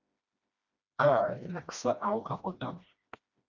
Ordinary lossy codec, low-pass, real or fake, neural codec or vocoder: Opus, 64 kbps; 7.2 kHz; fake; codec, 16 kHz, 1 kbps, FreqCodec, smaller model